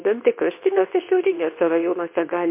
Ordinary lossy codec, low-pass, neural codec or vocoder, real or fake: MP3, 24 kbps; 3.6 kHz; codec, 24 kHz, 0.9 kbps, WavTokenizer, medium speech release version 2; fake